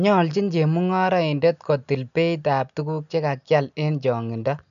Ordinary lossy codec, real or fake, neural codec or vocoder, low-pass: none; real; none; 7.2 kHz